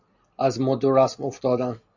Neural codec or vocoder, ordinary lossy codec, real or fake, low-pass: none; AAC, 48 kbps; real; 7.2 kHz